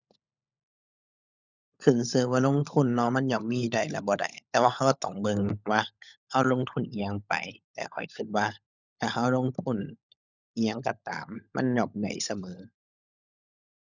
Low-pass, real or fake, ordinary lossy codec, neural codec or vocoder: 7.2 kHz; fake; none; codec, 16 kHz, 16 kbps, FunCodec, trained on LibriTTS, 50 frames a second